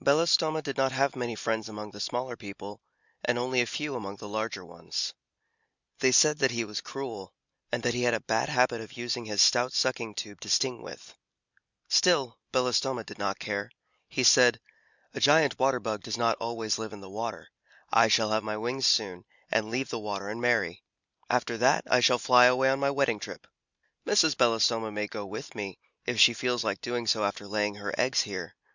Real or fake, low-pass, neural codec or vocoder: real; 7.2 kHz; none